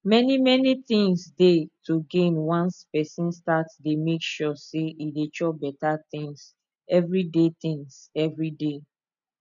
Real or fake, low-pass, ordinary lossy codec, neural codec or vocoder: real; 7.2 kHz; none; none